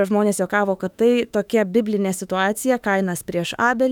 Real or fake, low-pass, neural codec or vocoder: fake; 19.8 kHz; autoencoder, 48 kHz, 32 numbers a frame, DAC-VAE, trained on Japanese speech